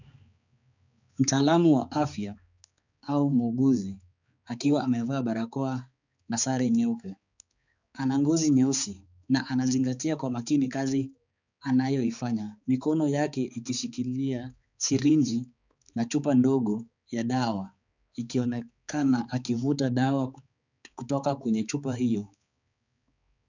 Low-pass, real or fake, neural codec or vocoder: 7.2 kHz; fake; codec, 16 kHz, 4 kbps, X-Codec, HuBERT features, trained on balanced general audio